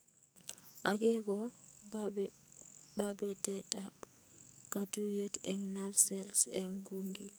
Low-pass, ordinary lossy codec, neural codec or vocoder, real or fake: none; none; codec, 44.1 kHz, 2.6 kbps, SNAC; fake